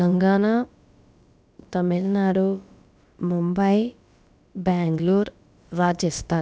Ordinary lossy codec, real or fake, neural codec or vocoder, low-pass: none; fake; codec, 16 kHz, about 1 kbps, DyCAST, with the encoder's durations; none